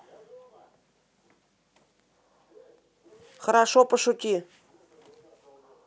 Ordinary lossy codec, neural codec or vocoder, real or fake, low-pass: none; none; real; none